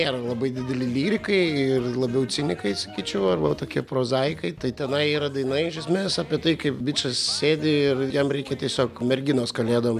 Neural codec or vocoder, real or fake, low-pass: none; real; 14.4 kHz